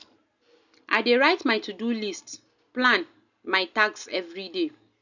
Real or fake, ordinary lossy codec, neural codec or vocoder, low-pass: real; none; none; 7.2 kHz